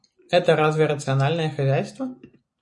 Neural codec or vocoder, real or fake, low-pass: none; real; 10.8 kHz